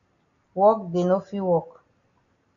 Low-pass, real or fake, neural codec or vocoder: 7.2 kHz; real; none